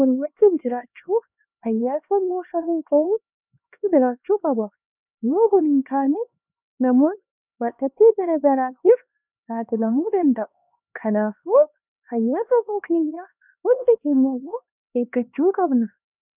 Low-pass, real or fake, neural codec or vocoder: 3.6 kHz; fake; codec, 16 kHz, 2 kbps, X-Codec, HuBERT features, trained on LibriSpeech